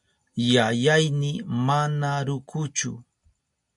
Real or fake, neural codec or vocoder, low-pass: real; none; 10.8 kHz